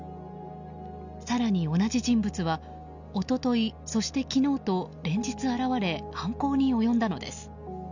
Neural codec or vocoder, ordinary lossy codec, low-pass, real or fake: none; none; 7.2 kHz; real